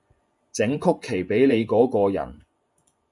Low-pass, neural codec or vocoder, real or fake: 10.8 kHz; vocoder, 24 kHz, 100 mel bands, Vocos; fake